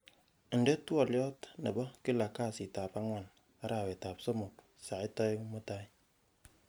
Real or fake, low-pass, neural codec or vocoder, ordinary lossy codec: real; none; none; none